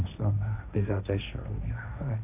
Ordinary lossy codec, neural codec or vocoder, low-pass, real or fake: none; codec, 16 kHz, 1.1 kbps, Voila-Tokenizer; 3.6 kHz; fake